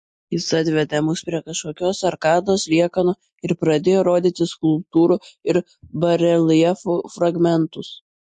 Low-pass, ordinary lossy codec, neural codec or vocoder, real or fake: 10.8 kHz; MP3, 48 kbps; none; real